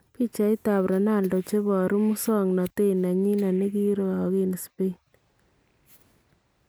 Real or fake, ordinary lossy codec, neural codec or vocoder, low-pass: real; none; none; none